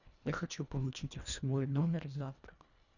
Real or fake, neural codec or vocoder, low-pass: fake; codec, 24 kHz, 1.5 kbps, HILCodec; 7.2 kHz